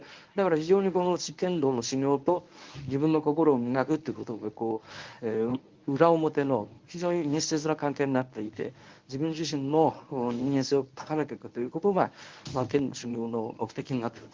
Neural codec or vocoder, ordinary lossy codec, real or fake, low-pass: codec, 24 kHz, 0.9 kbps, WavTokenizer, medium speech release version 1; Opus, 24 kbps; fake; 7.2 kHz